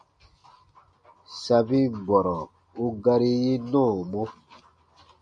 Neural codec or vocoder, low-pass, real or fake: none; 9.9 kHz; real